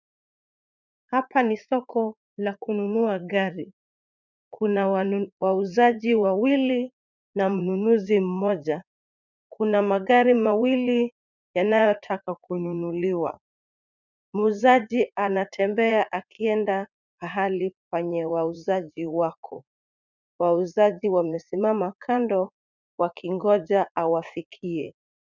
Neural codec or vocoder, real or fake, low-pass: vocoder, 44.1 kHz, 80 mel bands, Vocos; fake; 7.2 kHz